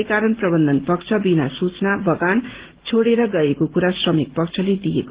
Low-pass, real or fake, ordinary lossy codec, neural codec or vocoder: 3.6 kHz; real; Opus, 16 kbps; none